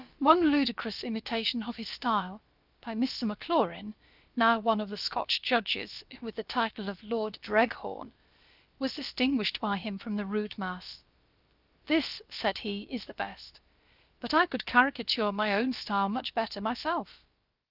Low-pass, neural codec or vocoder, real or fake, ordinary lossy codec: 5.4 kHz; codec, 16 kHz, about 1 kbps, DyCAST, with the encoder's durations; fake; Opus, 24 kbps